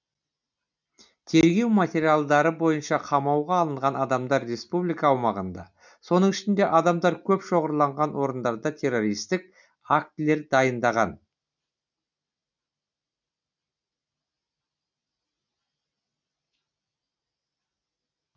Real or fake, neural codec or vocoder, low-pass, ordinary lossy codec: real; none; 7.2 kHz; none